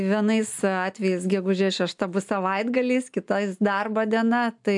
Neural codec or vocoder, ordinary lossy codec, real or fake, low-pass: none; MP3, 96 kbps; real; 10.8 kHz